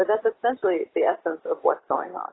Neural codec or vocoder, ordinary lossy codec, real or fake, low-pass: none; AAC, 16 kbps; real; 7.2 kHz